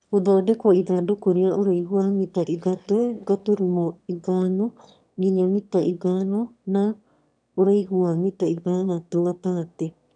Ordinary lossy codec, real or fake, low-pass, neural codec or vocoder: none; fake; 9.9 kHz; autoencoder, 22.05 kHz, a latent of 192 numbers a frame, VITS, trained on one speaker